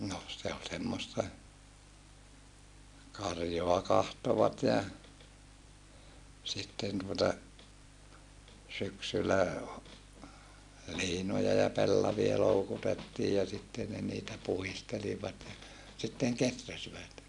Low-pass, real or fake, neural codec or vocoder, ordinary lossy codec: 10.8 kHz; real; none; none